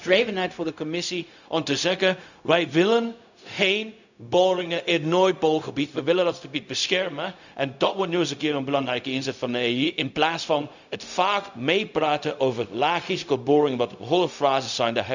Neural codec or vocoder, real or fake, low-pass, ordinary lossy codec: codec, 16 kHz, 0.4 kbps, LongCat-Audio-Codec; fake; 7.2 kHz; none